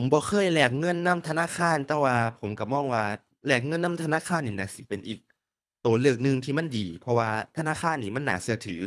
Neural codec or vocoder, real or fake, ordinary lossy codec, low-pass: codec, 24 kHz, 3 kbps, HILCodec; fake; none; none